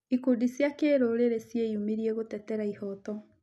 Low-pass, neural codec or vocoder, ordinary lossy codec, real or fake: none; none; none; real